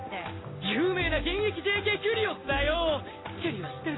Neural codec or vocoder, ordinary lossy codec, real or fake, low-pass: none; AAC, 16 kbps; real; 7.2 kHz